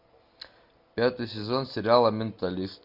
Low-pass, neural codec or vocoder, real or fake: 5.4 kHz; none; real